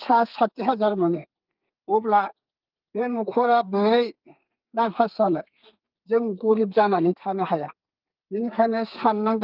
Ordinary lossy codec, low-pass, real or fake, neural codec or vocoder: Opus, 24 kbps; 5.4 kHz; fake; codec, 32 kHz, 1.9 kbps, SNAC